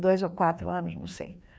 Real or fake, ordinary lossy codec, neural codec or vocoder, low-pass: fake; none; codec, 16 kHz, 2 kbps, FreqCodec, larger model; none